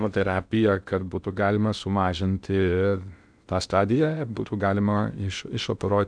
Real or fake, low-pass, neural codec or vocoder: fake; 9.9 kHz; codec, 16 kHz in and 24 kHz out, 0.6 kbps, FocalCodec, streaming, 2048 codes